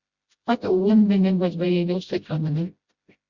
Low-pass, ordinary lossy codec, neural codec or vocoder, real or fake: 7.2 kHz; Opus, 64 kbps; codec, 16 kHz, 0.5 kbps, FreqCodec, smaller model; fake